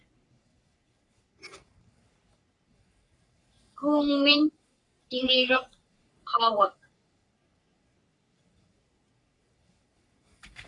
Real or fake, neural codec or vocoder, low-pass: fake; codec, 44.1 kHz, 3.4 kbps, Pupu-Codec; 10.8 kHz